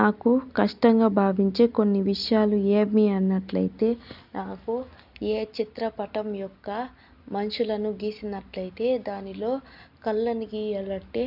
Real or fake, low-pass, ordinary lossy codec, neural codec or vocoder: real; 5.4 kHz; none; none